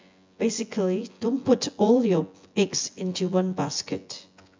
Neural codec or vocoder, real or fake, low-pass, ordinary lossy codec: vocoder, 24 kHz, 100 mel bands, Vocos; fake; 7.2 kHz; MP3, 64 kbps